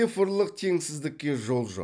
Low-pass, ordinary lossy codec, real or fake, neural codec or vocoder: 9.9 kHz; none; real; none